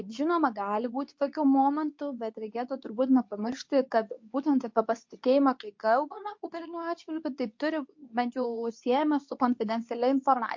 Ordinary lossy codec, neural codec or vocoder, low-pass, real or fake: MP3, 48 kbps; codec, 24 kHz, 0.9 kbps, WavTokenizer, medium speech release version 2; 7.2 kHz; fake